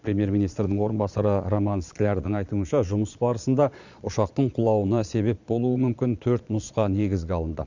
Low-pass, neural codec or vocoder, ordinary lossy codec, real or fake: 7.2 kHz; vocoder, 22.05 kHz, 80 mel bands, Vocos; Opus, 64 kbps; fake